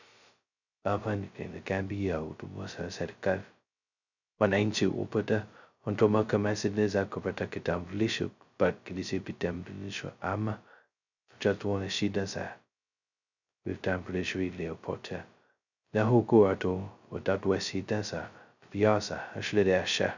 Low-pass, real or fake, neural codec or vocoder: 7.2 kHz; fake; codec, 16 kHz, 0.2 kbps, FocalCodec